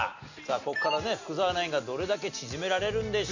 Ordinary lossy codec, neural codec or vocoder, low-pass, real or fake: none; none; 7.2 kHz; real